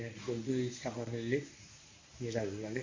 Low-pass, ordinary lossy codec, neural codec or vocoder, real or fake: 7.2 kHz; MP3, 32 kbps; codec, 24 kHz, 0.9 kbps, WavTokenizer, medium speech release version 1; fake